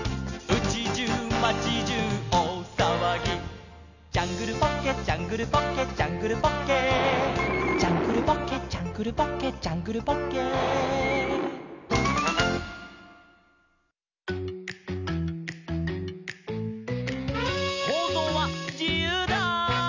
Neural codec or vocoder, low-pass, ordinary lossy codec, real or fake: none; 7.2 kHz; none; real